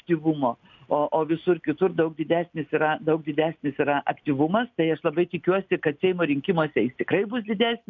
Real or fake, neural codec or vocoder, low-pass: real; none; 7.2 kHz